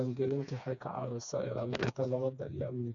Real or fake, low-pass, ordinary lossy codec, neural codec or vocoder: fake; 7.2 kHz; none; codec, 16 kHz, 2 kbps, FreqCodec, smaller model